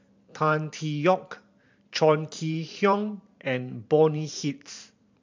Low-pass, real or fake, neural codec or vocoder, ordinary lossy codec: 7.2 kHz; fake; vocoder, 44.1 kHz, 128 mel bands every 512 samples, BigVGAN v2; MP3, 64 kbps